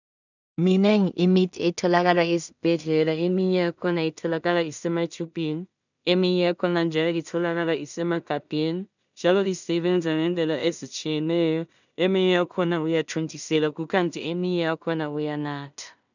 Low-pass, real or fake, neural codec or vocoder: 7.2 kHz; fake; codec, 16 kHz in and 24 kHz out, 0.4 kbps, LongCat-Audio-Codec, two codebook decoder